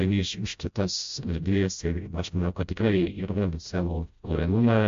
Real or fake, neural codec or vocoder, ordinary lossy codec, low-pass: fake; codec, 16 kHz, 0.5 kbps, FreqCodec, smaller model; MP3, 48 kbps; 7.2 kHz